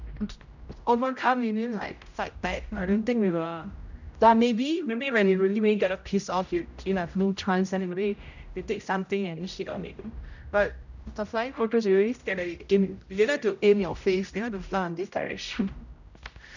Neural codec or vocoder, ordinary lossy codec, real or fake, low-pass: codec, 16 kHz, 0.5 kbps, X-Codec, HuBERT features, trained on general audio; none; fake; 7.2 kHz